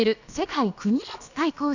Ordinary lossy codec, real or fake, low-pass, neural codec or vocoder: none; fake; 7.2 kHz; codec, 16 kHz, about 1 kbps, DyCAST, with the encoder's durations